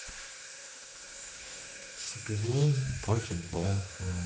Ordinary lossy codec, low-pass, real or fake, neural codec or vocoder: none; none; fake; codec, 16 kHz, 2 kbps, X-Codec, HuBERT features, trained on general audio